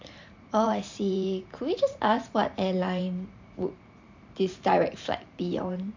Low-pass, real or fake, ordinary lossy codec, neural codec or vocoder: 7.2 kHz; fake; MP3, 64 kbps; vocoder, 44.1 kHz, 128 mel bands every 512 samples, BigVGAN v2